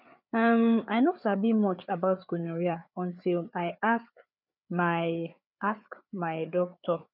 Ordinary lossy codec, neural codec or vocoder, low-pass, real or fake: none; codec, 16 kHz, 4 kbps, FreqCodec, larger model; 5.4 kHz; fake